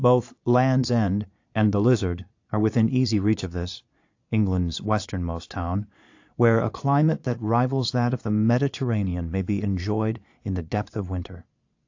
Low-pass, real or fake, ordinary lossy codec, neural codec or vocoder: 7.2 kHz; fake; AAC, 48 kbps; vocoder, 22.05 kHz, 80 mel bands, Vocos